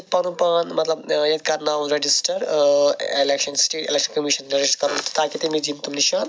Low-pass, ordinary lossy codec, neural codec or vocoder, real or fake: none; none; none; real